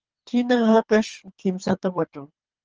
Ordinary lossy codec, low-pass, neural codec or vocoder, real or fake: Opus, 24 kbps; 7.2 kHz; codec, 24 kHz, 3 kbps, HILCodec; fake